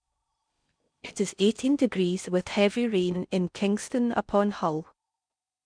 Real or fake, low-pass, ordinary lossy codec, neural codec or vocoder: fake; 9.9 kHz; none; codec, 16 kHz in and 24 kHz out, 0.6 kbps, FocalCodec, streaming, 4096 codes